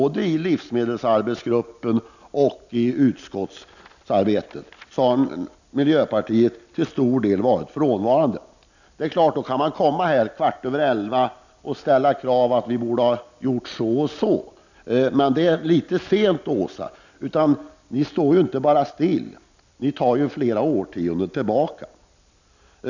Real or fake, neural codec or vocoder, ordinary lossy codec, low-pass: real; none; none; 7.2 kHz